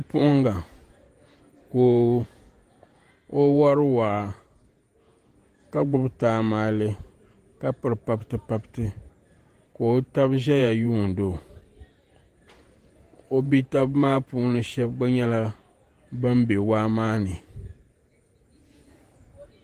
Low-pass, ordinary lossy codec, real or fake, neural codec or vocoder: 14.4 kHz; Opus, 24 kbps; fake; vocoder, 48 kHz, 128 mel bands, Vocos